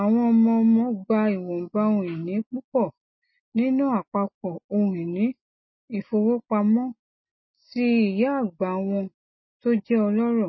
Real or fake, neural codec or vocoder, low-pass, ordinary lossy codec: real; none; 7.2 kHz; MP3, 24 kbps